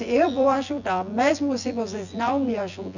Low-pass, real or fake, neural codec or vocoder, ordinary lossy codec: 7.2 kHz; fake; vocoder, 24 kHz, 100 mel bands, Vocos; none